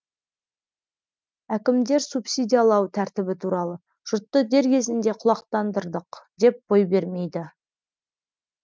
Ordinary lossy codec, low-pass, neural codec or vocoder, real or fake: none; none; none; real